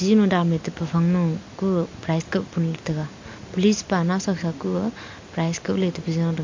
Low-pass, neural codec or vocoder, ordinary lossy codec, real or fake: 7.2 kHz; none; MP3, 48 kbps; real